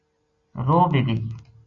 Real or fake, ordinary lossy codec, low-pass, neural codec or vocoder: real; Opus, 64 kbps; 7.2 kHz; none